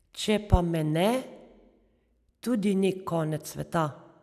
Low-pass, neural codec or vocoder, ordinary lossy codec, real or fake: 14.4 kHz; none; none; real